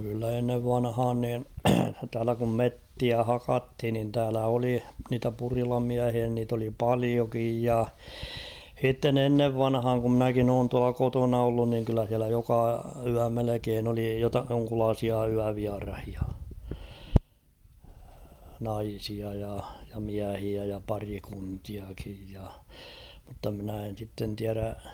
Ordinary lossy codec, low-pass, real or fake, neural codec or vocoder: Opus, 32 kbps; 19.8 kHz; real; none